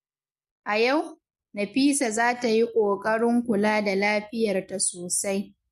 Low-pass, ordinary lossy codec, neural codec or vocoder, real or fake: 14.4 kHz; MP3, 64 kbps; none; real